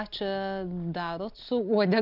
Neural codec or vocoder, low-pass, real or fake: none; 5.4 kHz; real